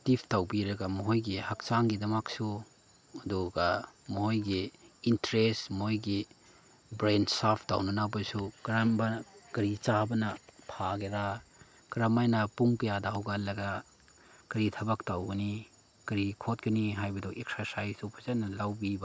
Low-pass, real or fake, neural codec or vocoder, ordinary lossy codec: none; real; none; none